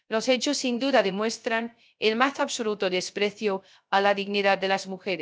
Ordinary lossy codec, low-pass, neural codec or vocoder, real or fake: none; none; codec, 16 kHz, 0.3 kbps, FocalCodec; fake